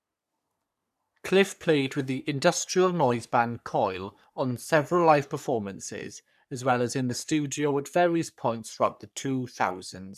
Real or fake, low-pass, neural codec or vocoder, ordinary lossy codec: fake; 14.4 kHz; codec, 44.1 kHz, 3.4 kbps, Pupu-Codec; none